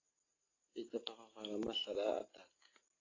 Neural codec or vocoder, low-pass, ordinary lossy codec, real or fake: none; 7.2 kHz; AAC, 32 kbps; real